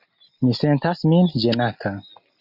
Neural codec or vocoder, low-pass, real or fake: none; 5.4 kHz; real